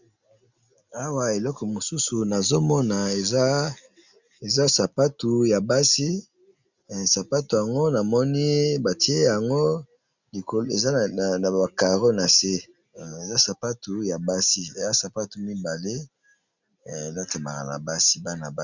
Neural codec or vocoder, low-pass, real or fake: none; 7.2 kHz; real